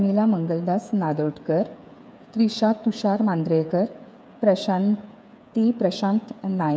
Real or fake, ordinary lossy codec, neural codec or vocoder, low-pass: fake; none; codec, 16 kHz, 8 kbps, FreqCodec, smaller model; none